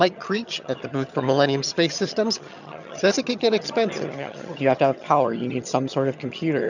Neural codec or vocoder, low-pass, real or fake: vocoder, 22.05 kHz, 80 mel bands, HiFi-GAN; 7.2 kHz; fake